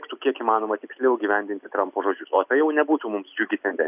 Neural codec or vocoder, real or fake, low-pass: none; real; 3.6 kHz